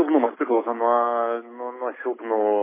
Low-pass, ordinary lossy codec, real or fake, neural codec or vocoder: 3.6 kHz; MP3, 16 kbps; real; none